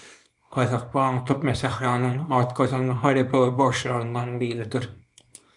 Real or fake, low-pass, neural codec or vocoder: fake; 10.8 kHz; codec, 24 kHz, 0.9 kbps, WavTokenizer, small release